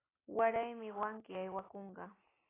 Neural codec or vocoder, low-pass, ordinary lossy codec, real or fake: none; 3.6 kHz; AAC, 16 kbps; real